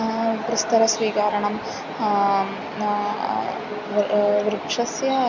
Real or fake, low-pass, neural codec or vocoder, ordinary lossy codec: real; 7.2 kHz; none; none